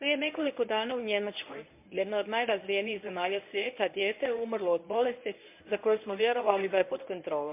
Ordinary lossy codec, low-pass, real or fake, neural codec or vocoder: MP3, 32 kbps; 3.6 kHz; fake; codec, 24 kHz, 0.9 kbps, WavTokenizer, medium speech release version 1